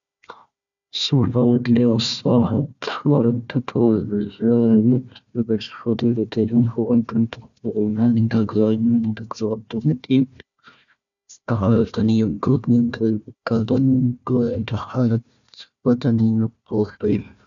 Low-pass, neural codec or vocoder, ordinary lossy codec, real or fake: 7.2 kHz; codec, 16 kHz, 1 kbps, FunCodec, trained on Chinese and English, 50 frames a second; none; fake